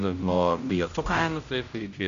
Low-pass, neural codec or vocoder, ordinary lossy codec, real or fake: 7.2 kHz; codec, 16 kHz, 0.5 kbps, X-Codec, HuBERT features, trained on general audio; Opus, 64 kbps; fake